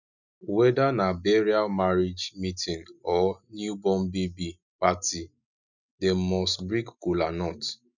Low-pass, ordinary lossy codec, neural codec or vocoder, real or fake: 7.2 kHz; none; none; real